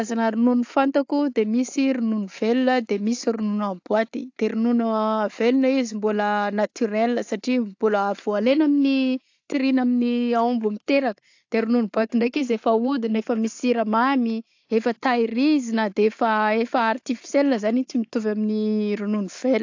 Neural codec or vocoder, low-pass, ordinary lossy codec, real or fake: none; 7.2 kHz; AAC, 48 kbps; real